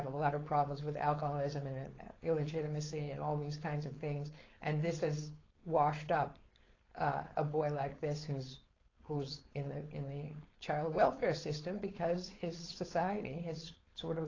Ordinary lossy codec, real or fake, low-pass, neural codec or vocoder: AAC, 32 kbps; fake; 7.2 kHz; codec, 16 kHz, 4.8 kbps, FACodec